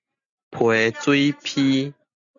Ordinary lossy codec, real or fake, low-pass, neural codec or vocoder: MP3, 96 kbps; real; 7.2 kHz; none